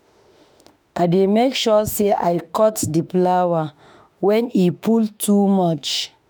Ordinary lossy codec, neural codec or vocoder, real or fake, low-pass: none; autoencoder, 48 kHz, 32 numbers a frame, DAC-VAE, trained on Japanese speech; fake; none